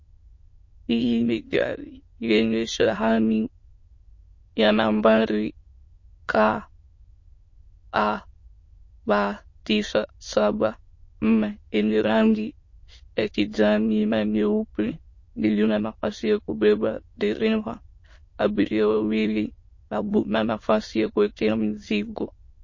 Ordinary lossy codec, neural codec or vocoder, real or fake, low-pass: MP3, 32 kbps; autoencoder, 22.05 kHz, a latent of 192 numbers a frame, VITS, trained on many speakers; fake; 7.2 kHz